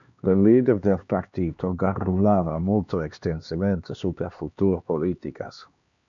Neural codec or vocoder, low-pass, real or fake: codec, 16 kHz, 2 kbps, X-Codec, HuBERT features, trained on LibriSpeech; 7.2 kHz; fake